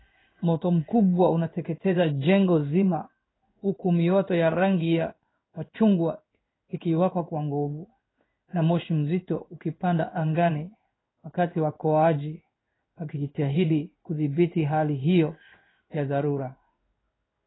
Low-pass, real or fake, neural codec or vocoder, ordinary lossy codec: 7.2 kHz; fake; codec, 16 kHz in and 24 kHz out, 1 kbps, XY-Tokenizer; AAC, 16 kbps